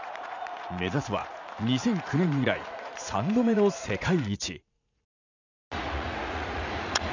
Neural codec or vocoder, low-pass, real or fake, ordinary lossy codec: vocoder, 44.1 kHz, 80 mel bands, Vocos; 7.2 kHz; fake; none